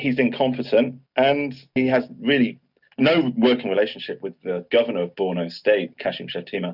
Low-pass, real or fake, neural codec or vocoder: 5.4 kHz; real; none